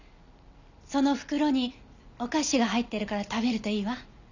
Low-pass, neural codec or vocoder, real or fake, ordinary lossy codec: 7.2 kHz; none; real; none